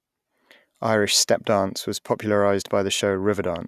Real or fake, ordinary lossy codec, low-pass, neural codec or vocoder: real; none; 14.4 kHz; none